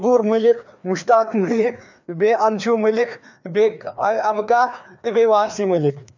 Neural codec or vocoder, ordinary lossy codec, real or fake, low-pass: codec, 16 kHz, 2 kbps, FreqCodec, larger model; none; fake; 7.2 kHz